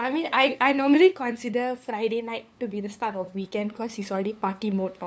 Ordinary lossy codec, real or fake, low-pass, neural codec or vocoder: none; fake; none; codec, 16 kHz, 2 kbps, FunCodec, trained on LibriTTS, 25 frames a second